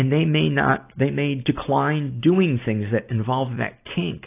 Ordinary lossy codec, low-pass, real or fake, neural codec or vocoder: AAC, 24 kbps; 3.6 kHz; real; none